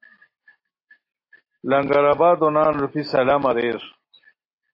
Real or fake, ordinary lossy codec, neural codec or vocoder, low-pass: real; AAC, 32 kbps; none; 5.4 kHz